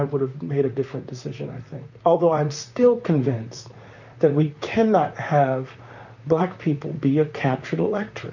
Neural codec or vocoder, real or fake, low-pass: vocoder, 44.1 kHz, 128 mel bands, Pupu-Vocoder; fake; 7.2 kHz